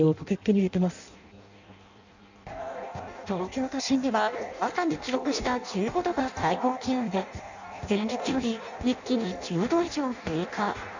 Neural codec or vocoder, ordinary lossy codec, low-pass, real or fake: codec, 16 kHz in and 24 kHz out, 0.6 kbps, FireRedTTS-2 codec; none; 7.2 kHz; fake